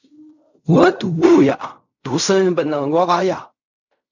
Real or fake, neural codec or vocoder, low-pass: fake; codec, 16 kHz in and 24 kHz out, 0.4 kbps, LongCat-Audio-Codec, fine tuned four codebook decoder; 7.2 kHz